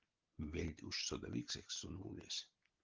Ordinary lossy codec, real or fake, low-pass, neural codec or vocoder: Opus, 16 kbps; real; 7.2 kHz; none